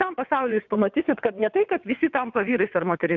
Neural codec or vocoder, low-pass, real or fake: vocoder, 22.05 kHz, 80 mel bands, WaveNeXt; 7.2 kHz; fake